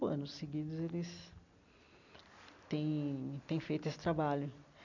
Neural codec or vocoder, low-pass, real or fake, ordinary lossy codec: none; 7.2 kHz; real; none